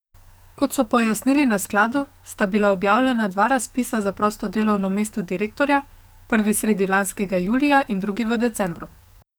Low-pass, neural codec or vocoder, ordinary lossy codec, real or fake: none; codec, 44.1 kHz, 2.6 kbps, SNAC; none; fake